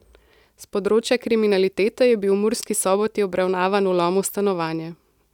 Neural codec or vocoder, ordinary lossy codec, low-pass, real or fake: none; none; 19.8 kHz; real